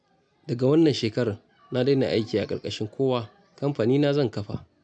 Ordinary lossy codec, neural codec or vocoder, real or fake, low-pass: none; none; real; 9.9 kHz